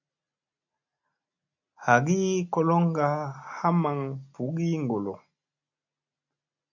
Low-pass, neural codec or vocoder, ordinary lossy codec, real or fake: 7.2 kHz; none; AAC, 48 kbps; real